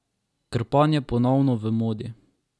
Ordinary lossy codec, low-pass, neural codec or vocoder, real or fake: none; none; none; real